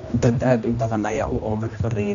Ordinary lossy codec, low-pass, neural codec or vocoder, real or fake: none; 7.2 kHz; codec, 16 kHz, 1 kbps, X-Codec, HuBERT features, trained on general audio; fake